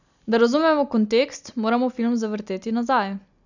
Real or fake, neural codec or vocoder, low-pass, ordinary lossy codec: real; none; 7.2 kHz; none